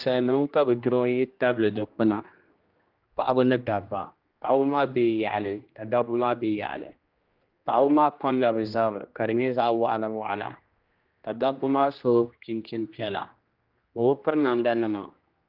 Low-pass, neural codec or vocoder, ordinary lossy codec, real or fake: 5.4 kHz; codec, 16 kHz, 1 kbps, X-Codec, HuBERT features, trained on general audio; Opus, 32 kbps; fake